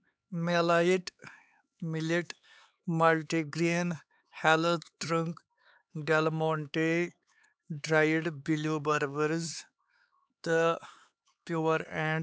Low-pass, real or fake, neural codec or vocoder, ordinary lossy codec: none; fake; codec, 16 kHz, 4 kbps, X-Codec, HuBERT features, trained on LibriSpeech; none